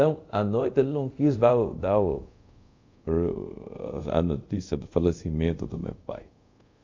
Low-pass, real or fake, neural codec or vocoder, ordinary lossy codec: 7.2 kHz; fake; codec, 24 kHz, 0.5 kbps, DualCodec; MP3, 64 kbps